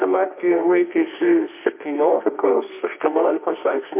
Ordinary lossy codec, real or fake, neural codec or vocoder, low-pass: MP3, 24 kbps; fake; codec, 24 kHz, 0.9 kbps, WavTokenizer, medium music audio release; 3.6 kHz